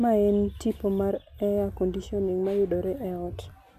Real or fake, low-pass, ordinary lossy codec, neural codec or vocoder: real; 14.4 kHz; none; none